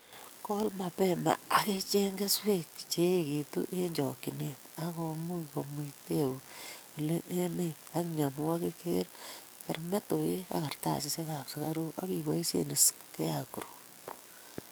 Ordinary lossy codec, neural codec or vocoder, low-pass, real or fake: none; codec, 44.1 kHz, 7.8 kbps, DAC; none; fake